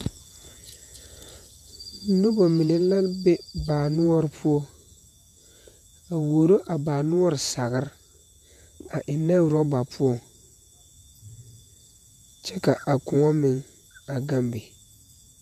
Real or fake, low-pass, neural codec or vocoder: fake; 14.4 kHz; vocoder, 48 kHz, 128 mel bands, Vocos